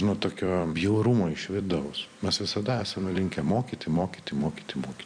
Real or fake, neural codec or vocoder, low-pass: fake; vocoder, 44.1 kHz, 128 mel bands every 256 samples, BigVGAN v2; 9.9 kHz